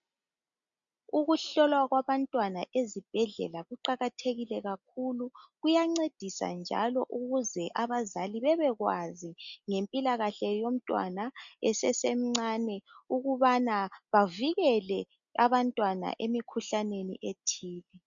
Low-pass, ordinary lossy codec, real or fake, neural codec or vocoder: 7.2 kHz; MP3, 96 kbps; real; none